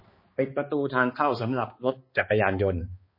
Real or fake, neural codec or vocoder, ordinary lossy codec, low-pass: fake; codec, 16 kHz, 2 kbps, X-Codec, HuBERT features, trained on balanced general audio; MP3, 24 kbps; 5.4 kHz